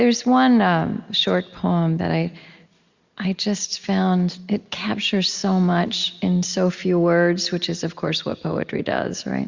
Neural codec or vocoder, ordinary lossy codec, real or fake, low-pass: none; Opus, 64 kbps; real; 7.2 kHz